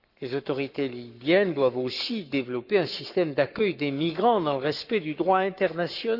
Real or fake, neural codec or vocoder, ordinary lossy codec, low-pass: fake; codec, 44.1 kHz, 7.8 kbps, Pupu-Codec; none; 5.4 kHz